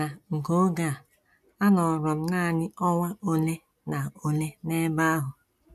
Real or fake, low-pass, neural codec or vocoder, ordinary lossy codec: real; 14.4 kHz; none; MP3, 96 kbps